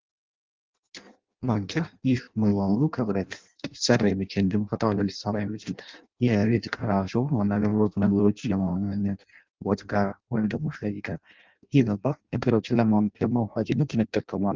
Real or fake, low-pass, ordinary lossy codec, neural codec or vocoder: fake; 7.2 kHz; Opus, 32 kbps; codec, 16 kHz in and 24 kHz out, 0.6 kbps, FireRedTTS-2 codec